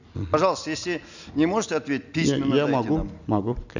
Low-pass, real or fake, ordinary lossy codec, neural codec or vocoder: 7.2 kHz; real; none; none